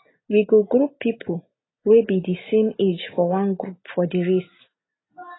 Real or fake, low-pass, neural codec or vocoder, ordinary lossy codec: real; 7.2 kHz; none; AAC, 16 kbps